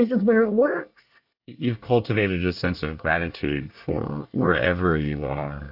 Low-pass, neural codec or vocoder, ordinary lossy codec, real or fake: 5.4 kHz; codec, 24 kHz, 1 kbps, SNAC; AAC, 48 kbps; fake